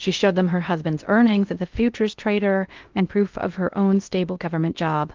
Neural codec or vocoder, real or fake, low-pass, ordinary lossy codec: codec, 16 kHz in and 24 kHz out, 0.6 kbps, FocalCodec, streaming, 2048 codes; fake; 7.2 kHz; Opus, 32 kbps